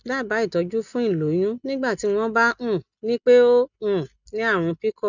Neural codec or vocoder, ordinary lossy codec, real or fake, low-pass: none; none; real; 7.2 kHz